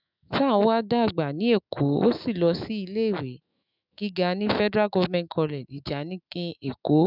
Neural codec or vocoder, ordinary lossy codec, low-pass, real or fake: autoencoder, 48 kHz, 128 numbers a frame, DAC-VAE, trained on Japanese speech; none; 5.4 kHz; fake